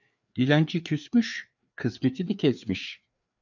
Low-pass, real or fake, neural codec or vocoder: 7.2 kHz; fake; codec, 16 kHz, 4 kbps, FreqCodec, larger model